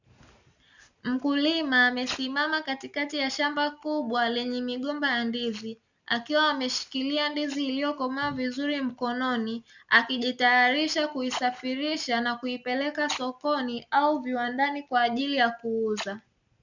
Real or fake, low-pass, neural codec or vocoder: real; 7.2 kHz; none